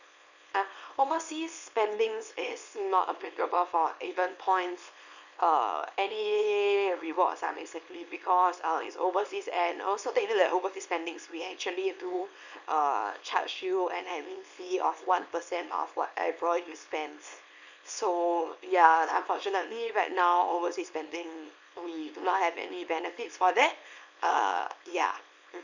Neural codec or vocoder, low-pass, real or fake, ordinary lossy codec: codec, 24 kHz, 0.9 kbps, WavTokenizer, small release; 7.2 kHz; fake; none